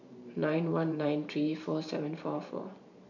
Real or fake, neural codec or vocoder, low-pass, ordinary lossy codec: fake; vocoder, 44.1 kHz, 128 mel bands every 512 samples, BigVGAN v2; 7.2 kHz; none